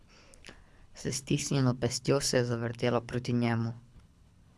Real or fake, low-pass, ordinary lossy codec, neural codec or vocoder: fake; none; none; codec, 24 kHz, 6 kbps, HILCodec